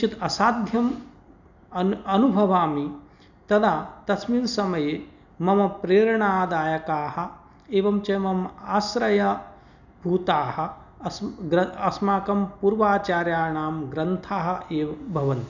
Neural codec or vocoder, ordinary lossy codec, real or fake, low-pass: none; none; real; 7.2 kHz